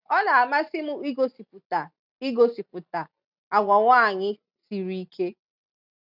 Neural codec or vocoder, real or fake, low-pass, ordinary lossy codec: none; real; 5.4 kHz; none